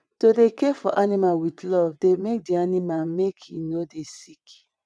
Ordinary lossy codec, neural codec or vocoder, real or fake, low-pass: none; vocoder, 22.05 kHz, 80 mel bands, WaveNeXt; fake; none